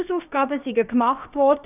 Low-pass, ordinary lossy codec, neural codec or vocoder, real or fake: 3.6 kHz; none; codec, 16 kHz, about 1 kbps, DyCAST, with the encoder's durations; fake